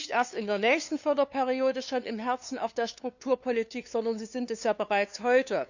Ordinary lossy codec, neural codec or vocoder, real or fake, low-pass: none; codec, 16 kHz, 2 kbps, FunCodec, trained on LibriTTS, 25 frames a second; fake; 7.2 kHz